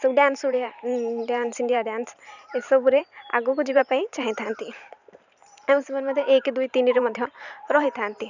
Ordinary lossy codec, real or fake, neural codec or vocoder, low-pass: none; fake; vocoder, 44.1 kHz, 128 mel bands every 512 samples, BigVGAN v2; 7.2 kHz